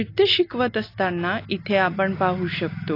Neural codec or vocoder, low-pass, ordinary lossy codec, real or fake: none; 5.4 kHz; AAC, 32 kbps; real